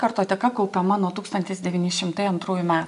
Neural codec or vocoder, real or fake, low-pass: none; real; 10.8 kHz